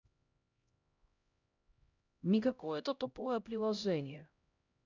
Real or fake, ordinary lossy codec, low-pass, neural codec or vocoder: fake; none; 7.2 kHz; codec, 16 kHz, 0.5 kbps, X-Codec, HuBERT features, trained on LibriSpeech